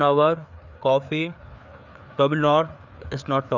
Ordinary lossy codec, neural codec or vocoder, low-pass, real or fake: none; codec, 16 kHz, 4 kbps, FreqCodec, larger model; 7.2 kHz; fake